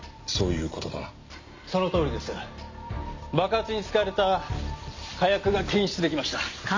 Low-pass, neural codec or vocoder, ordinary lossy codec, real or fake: 7.2 kHz; none; MP3, 64 kbps; real